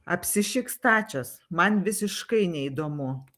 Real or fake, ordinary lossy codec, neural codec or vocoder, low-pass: real; Opus, 24 kbps; none; 14.4 kHz